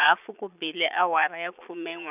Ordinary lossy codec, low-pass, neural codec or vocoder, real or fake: none; 3.6 kHz; vocoder, 22.05 kHz, 80 mel bands, Vocos; fake